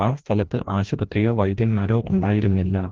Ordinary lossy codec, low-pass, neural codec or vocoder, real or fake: Opus, 16 kbps; 7.2 kHz; codec, 16 kHz, 1 kbps, FreqCodec, larger model; fake